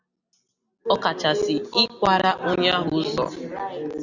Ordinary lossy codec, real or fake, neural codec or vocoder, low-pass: Opus, 64 kbps; real; none; 7.2 kHz